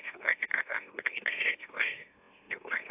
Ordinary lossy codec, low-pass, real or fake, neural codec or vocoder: AAC, 32 kbps; 3.6 kHz; fake; autoencoder, 44.1 kHz, a latent of 192 numbers a frame, MeloTTS